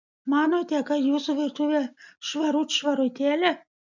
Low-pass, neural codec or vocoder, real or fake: 7.2 kHz; none; real